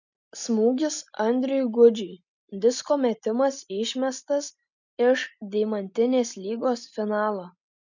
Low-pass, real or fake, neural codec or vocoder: 7.2 kHz; real; none